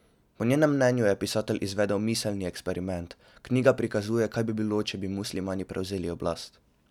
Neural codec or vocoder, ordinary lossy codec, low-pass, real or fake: none; none; 19.8 kHz; real